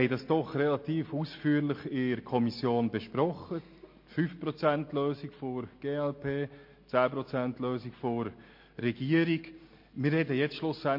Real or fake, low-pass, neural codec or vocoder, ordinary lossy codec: real; 5.4 kHz; none; MP3, 32 kbps